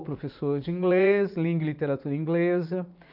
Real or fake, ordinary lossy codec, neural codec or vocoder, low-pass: fake; none; vocoder, 44.1 kHz, 80 mel bands, Vocos; 5.4 kHz